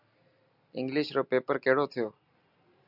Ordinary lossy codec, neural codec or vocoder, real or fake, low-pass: Opus, 64 kbps; none; real; 5.4 kHz